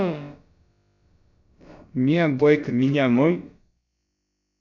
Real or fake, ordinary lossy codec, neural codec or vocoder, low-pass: fake; none; codec, 16 kHz, about 1 kbps, DyCAST, with the encoder's durations; 7.2 kHz